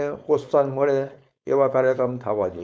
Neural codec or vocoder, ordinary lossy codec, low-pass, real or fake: codec, 16 kHz, 4.8 kbps, FACodec; none; none; fake